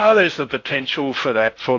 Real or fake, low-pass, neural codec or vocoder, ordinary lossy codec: fake; 7.2 kHz; codec, 16 kHz in and 24 kHz out, 0.6 kbps, FocalCodec, streaming, 4096 codes; AAC, 48 kbps